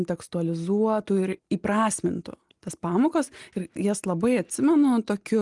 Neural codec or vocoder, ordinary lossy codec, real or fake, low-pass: none; Opus, 24 kbps; real; 10.8 kHz